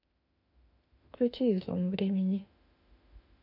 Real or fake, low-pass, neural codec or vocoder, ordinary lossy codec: fake; 5.4 kHz; autoencoder, 48 kHz, 32 numbers a frame, DAC-VAE, trained on Japanese speech; none